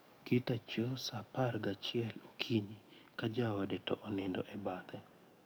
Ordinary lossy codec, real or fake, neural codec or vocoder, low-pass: none; fake; codec, 44.1 kHz, 7.8 kbps, DAC; none